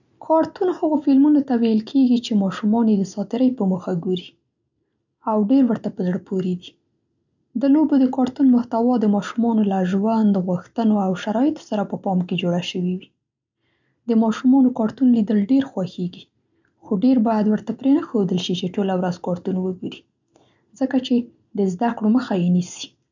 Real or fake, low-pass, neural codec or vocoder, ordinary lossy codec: real; 7.2 kHz; none; none